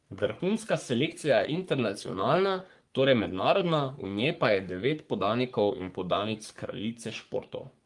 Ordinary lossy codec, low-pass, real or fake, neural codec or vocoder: Opus, 32 kbps; 10.8 kHz; fake; codec, 44.1 kHz, 3.4 kbps, Pupu-Codec